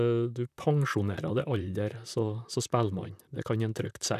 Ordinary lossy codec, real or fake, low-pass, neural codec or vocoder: none; fake; 14.4 kHz; vocoder, 44.1 kHz, 128 mel bands, Pupu-Vocoder